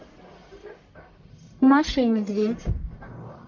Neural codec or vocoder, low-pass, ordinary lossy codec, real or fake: codec, 44.1 kHz, 1.7 kbps, Pupu-Codec; 7.2 kHz; MP3, 48 kbps; fake